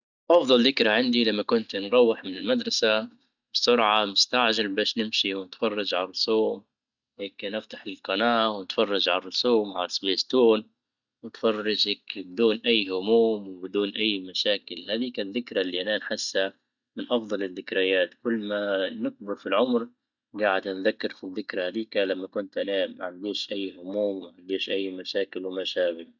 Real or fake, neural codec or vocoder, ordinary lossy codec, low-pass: real; none; none; 7.2 kHz